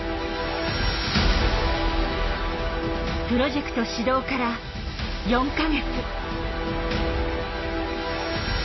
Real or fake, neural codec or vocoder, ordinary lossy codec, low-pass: real; none; MP3, 24 kbps; 7.2 kHz